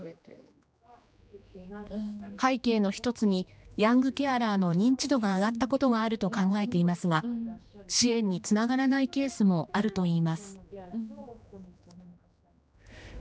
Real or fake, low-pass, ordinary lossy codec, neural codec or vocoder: fake; none; none; codec, 16 kHz, 2 kbps, X-Codec, HuBERT features, trained on general audio